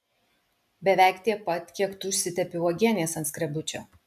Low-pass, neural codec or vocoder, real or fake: 14.4 kHz; none; real